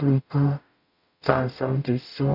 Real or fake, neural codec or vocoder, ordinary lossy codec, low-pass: fake; codec, 44.1 kHz, 0.9 kbps, DAC; none; 5.4 kHz